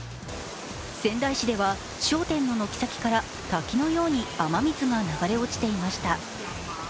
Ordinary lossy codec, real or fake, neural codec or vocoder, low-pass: none; real; none; none